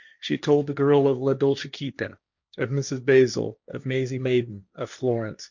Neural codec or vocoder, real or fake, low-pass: codec, 16 kHz, 1.1 kbps, Voila-Tokenizer; fake; 7.2 kHz